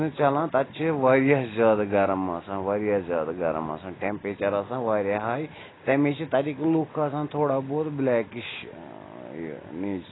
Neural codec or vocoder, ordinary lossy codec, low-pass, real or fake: none; AAC, 16 kbps; 7.2 kHz; real